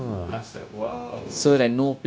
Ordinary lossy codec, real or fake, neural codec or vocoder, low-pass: none; fake; codec, 16 kHz, 0.9 kbps, LongCat-Audio-Codec; none